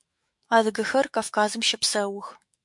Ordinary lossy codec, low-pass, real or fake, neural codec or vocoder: MP3, 64 kbps; 10.8 kHz; fake; autoencoder, 48 kHz, 128 numbers a frame, DAC-VAE, trained on Japanese speech